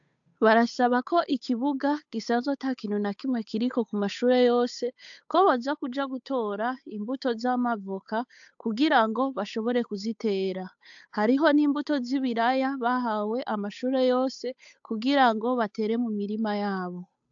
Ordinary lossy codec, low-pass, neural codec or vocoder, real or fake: AAC, 64 kbps; 7.2 kHz; codec, 16 kHz, 8 kbps, FunCodec, trained on Chinese and English, 25 frames a second; fake